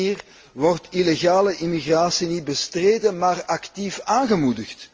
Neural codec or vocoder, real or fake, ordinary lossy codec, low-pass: none; real; Opus, 24 kbps; 7.2 kHz